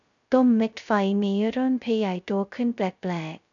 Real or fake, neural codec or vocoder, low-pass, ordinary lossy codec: fake; codec, 16 kHz, 0.2 kbps, FocalCodec; 7.2 kHz; none